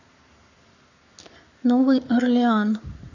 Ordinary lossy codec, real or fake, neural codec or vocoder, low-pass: none; fake; codec, 44.1 kHz, 7.8 kbps, Pupu-Codec; 7.2 kHz